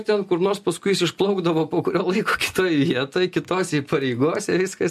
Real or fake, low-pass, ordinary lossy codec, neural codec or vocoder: real; 14.4 kHz; MP3, 64 kbps; none